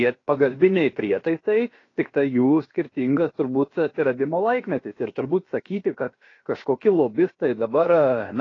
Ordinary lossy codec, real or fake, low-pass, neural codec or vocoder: AAC, 32 kbps; fake; 7.2 kHz; codec, 16 kHz, about 1 kbps, DyCAST, with the encoder's durations